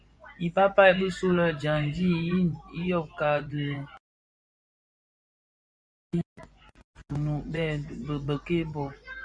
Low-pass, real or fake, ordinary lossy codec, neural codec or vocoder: 9.9 kHz; fake; Opus, 64 kbps; vocoder, 24 kHz, 100 mel bands, Vocos